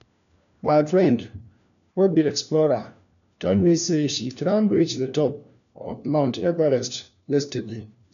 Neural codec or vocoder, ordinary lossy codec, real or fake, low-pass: codec, 16 kHz, 1 kbps, FunCodec, trained on LibriTTS, 50 frames a second; none; fake; 7.2 kHz